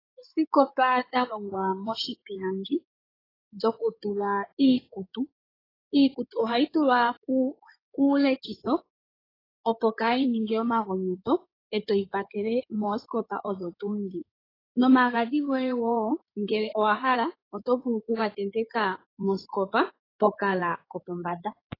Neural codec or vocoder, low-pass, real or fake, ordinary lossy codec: codec, 16 kHz in and 24 kHz out, 2.2 kbps, FireRedTTS-2 codec; 5.4 kHz; fake; AAC, 24 kbps